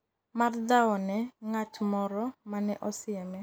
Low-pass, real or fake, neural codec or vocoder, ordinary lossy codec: none; real; none; none